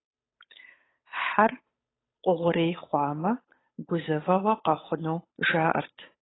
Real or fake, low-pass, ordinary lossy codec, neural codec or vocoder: fake; 7.2 kHz; AAC, 16 kbps; codec, 16 kHz, 8 kbps, FunCodec, trained on Chinese and English, 25 frames a second